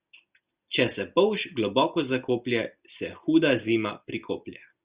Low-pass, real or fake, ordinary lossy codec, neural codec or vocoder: 3.6 kHz; real; Opus, 64 kbps; none